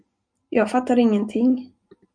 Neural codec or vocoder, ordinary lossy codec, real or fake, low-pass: none; MP3, 96 kbps; real; 9.9 kHz